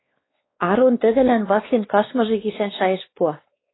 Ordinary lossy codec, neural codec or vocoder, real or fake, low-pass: AAC, 16 kbps; codec, 16 kHz, 2 kbps, X-Codec, WavLM features, trained on Multilingual LibriSpeech; fake; 7.2 kHz